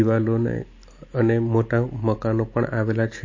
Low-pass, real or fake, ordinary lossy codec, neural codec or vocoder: 7.2 kHz; real; MP3, 32 kbps; none